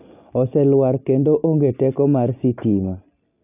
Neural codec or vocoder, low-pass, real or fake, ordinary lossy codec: none; 3.6 kHz; real; none